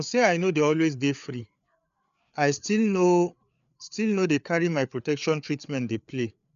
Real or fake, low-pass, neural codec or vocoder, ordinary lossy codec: fake; 7.2 kHz; codec, 16 kHz, 4 kbps, FreqCodec, larger model; none